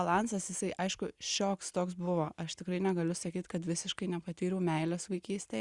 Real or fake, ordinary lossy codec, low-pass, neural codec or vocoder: real; Opus, 64 kbps; 10.8 kHz; none